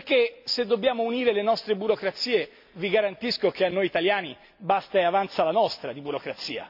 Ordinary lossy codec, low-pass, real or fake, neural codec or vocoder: MP3, 48 kbps; 5.4 kHz; real; none